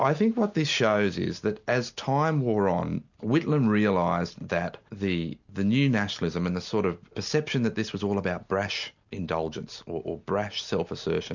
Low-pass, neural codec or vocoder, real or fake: 7.2 kHz; none; real